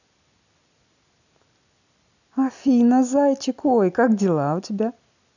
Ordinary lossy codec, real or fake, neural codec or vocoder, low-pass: none; real; none; 7.2 kHz